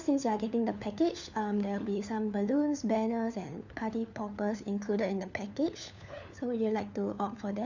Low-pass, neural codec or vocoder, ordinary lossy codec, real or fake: 7.2 kHz; codec, 16 kHz, 4 kbps, FunCodec, trained on LibriTTS, 50 frames a second; none; fake